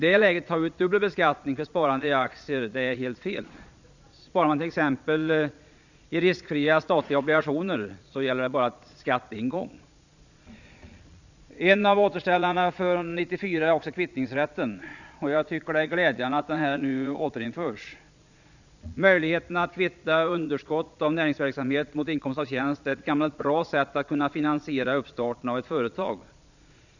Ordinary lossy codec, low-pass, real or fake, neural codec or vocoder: none; 7.2 kHz; fake; vocoder, 22.05 kHz, 80 mel bands, Vocos